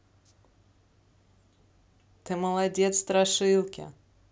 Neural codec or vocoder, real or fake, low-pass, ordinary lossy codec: none; real; none; none